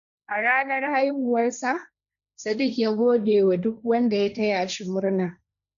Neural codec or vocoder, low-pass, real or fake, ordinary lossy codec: codec, 16 kHz, 1.1 kbps, Voila-Tokenizer; 7.2 kHz; fake; none